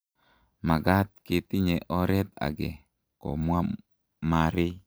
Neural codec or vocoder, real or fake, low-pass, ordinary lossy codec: none; real; none; none